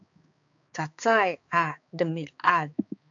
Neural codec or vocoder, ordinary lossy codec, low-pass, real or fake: codec, 16 kHz, 4 kbps, X-Codec, HuBERT features, trained on balanced general audio; AAC, 64 kbps; 7.2 kHz; fake